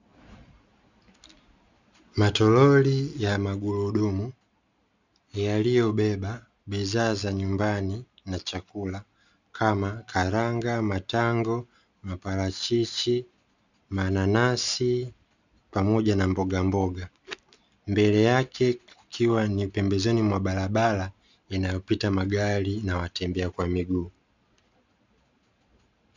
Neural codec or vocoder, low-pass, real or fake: none; 7.2 kHz; real